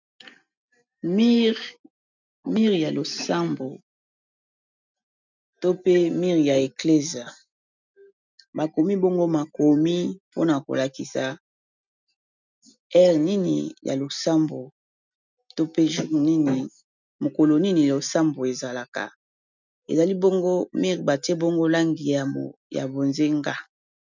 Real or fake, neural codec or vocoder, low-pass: real; none; 7.2 kHz